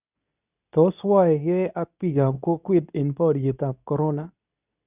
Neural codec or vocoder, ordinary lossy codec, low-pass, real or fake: codec, 24 kHz, 0.9 kbps, WavTokenizer, medium speech release version 2; none; 3.6 kHz; fake